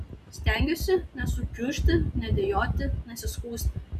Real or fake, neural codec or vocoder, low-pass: real; none; 14.4 kHz